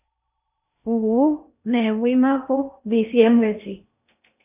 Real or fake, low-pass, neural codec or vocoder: fake; 3.6 kHz; codec, 16 kHz in and 24 kHz out, 0.8 kbps, FocalCodec, streaming, 65536 codes